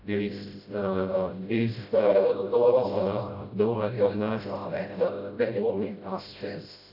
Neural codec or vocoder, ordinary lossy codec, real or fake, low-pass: codec, 16 kHz, 0.5 kbps, FreqCodec, smaller model; none; fake; 5.4 kHz